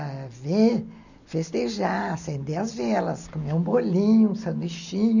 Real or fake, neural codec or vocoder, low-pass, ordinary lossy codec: real; none; 7.2 kHz; none